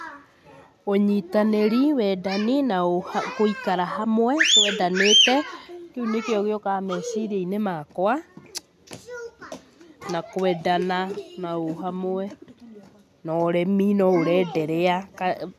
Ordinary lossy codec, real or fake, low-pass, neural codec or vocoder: none; real; 14.4 kHz; none